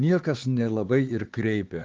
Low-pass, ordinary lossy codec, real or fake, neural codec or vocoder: 7.2 kHz; Opus, 16 kbps; fake; codec, 16 kHz, 4 kbps, X-Codec, HuBERT features, trained on LibriSpeech